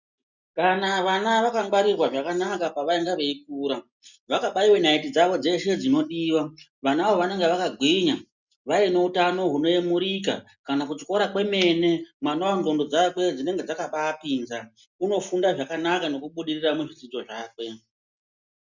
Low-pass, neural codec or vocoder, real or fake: 7.2 kHz; none; real